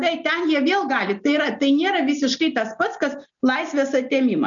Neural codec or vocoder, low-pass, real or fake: none; 7.2 kHz; real